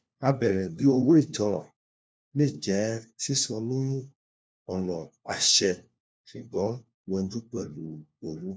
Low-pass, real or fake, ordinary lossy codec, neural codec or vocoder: none; fake; none; codec, 16 kHz, 1 kbps, FunCodec, trained on LibriTTS, 50 frames a second